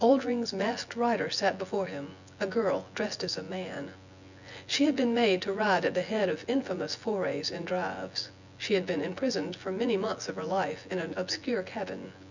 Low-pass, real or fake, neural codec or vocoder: 7.2 kHz; fake; vocoder, 24 kHz, 100 mel bands, Vocos